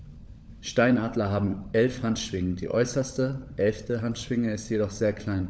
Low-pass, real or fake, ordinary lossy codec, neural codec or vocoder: none; fake; none; codec, 16 kHz, 16 kbps, FunCodec, trained on LibriTTS, 50 frames a second